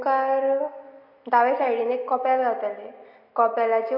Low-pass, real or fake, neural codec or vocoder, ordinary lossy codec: 5.4 kHz; real; none; MP3, 32 kbps